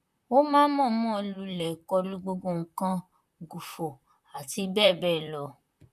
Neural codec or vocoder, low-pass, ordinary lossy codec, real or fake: vocoder, 44.1 kHz, 128 mel bands, Pupu-Vocoder; 14.4 kHz; none; fake